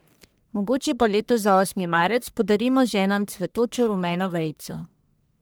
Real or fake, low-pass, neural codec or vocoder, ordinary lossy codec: fake; none; codec, 44.1 kHz, 1.7 kbps, Pupu-Codec; none